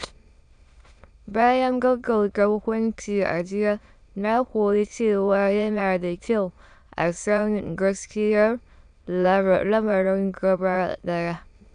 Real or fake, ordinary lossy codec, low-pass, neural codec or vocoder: fake; none; 9.9 kHz; autoencoder, 22.05 kHz, a latent of 192 numbers a frame, VITS, trained on many speakers